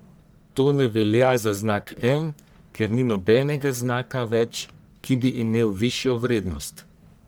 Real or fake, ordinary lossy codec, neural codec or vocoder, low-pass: fake; none; codec, 44.1 kHz, 1.7 kbps, Pupu-Codec; none